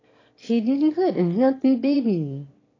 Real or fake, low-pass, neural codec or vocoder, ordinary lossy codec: fake; 7.2 kHz; autoencoder, 22.05 kHz, a latent of 192 numbers a frame, VITS, trained on one speaker; AAC, 32 kbps